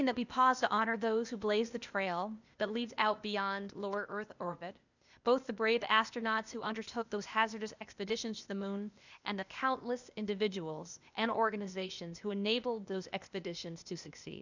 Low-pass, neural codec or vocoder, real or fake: 7.2 kHz; codec, 16 kHz, 0.8 kbps, ZipCodec; fake